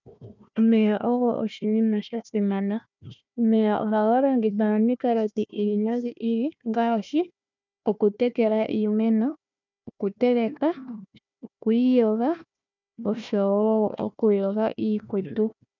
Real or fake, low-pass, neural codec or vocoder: fake; 7.2 kHz; codec, 16 kHz, 1 kbps, FunCodec, trained on Chinese and English, 50 frames a second